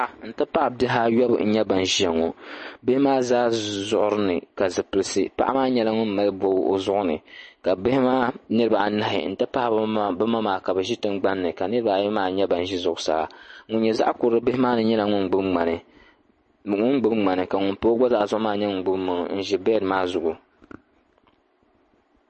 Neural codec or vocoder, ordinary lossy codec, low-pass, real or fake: none; MP3, 32 kbps; 10.8 kHz; real